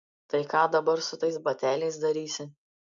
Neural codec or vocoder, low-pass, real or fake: none; 7.2 kHz; real